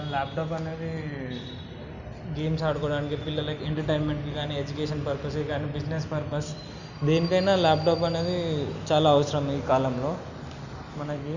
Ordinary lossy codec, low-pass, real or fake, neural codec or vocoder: Opus, 64 kbps; 7.2 kHz; real; none